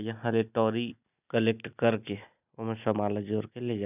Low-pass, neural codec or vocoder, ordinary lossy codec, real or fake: 3.6 kHz; none; none; real